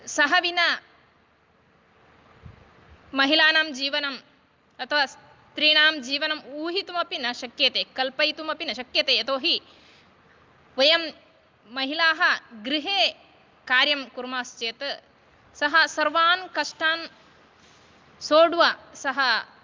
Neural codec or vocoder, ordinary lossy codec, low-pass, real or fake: none; Opus, 24 kbps; 7.2 kHz; real